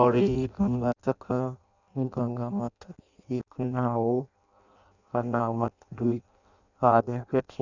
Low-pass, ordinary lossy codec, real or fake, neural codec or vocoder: 7.2 kHz; none; fake; codec, 16 kHz in and 24 kHz out, 0.6 kbps, FireRedTTS-2 codec